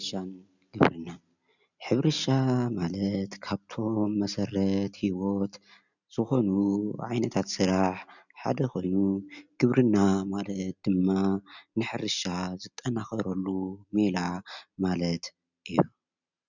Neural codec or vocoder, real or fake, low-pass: none; real; 7.2 kHz